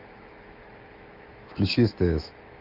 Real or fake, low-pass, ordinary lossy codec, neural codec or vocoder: fake; 5.4 kHz; Opus, 24 kbps; autoencoder, 48 kHz, 128 numbers a frame, DAC-VAE, trained on Japanese speech